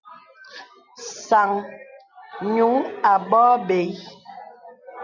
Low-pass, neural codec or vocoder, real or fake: 7.2 kHz; none; real